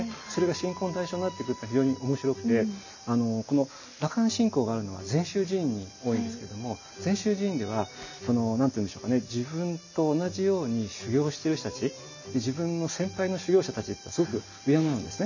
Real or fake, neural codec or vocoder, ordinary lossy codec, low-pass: real; none; none; 7.2 kHz